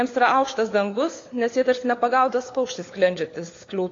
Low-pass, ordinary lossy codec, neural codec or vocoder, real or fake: 7.2 kHz; AAC, 32 kbps; codec, 16 kHz, 4 kbps, FunCodec, trained on LibriTTS, 50 frames a second; fake